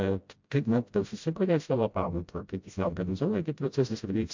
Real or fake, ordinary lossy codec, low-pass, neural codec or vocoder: fake; MP3, 64 kbps; 7.2 kHz; codec, 16 kHz, 0.5 kbps, FreqCodec, smaller model